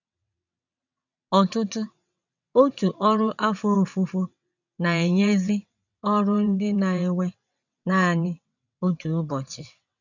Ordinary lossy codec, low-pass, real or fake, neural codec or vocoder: none; 7.2 kHz; fake; vocoder, 22.05 kHz, 80 mel bands, WaveNeXt